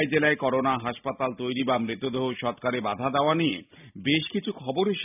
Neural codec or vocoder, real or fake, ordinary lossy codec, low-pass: none; real; none; 3.6 kHz